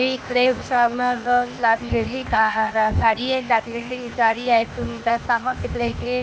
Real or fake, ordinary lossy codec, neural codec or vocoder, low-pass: fake; none; codec, 16 kHz, 0.8 kbps, ZipCodec; none